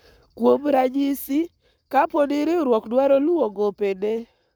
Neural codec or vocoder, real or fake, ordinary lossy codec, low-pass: codec, 44.1 kHz, 7.8 kbps, Pupu-Codec; fake; none; none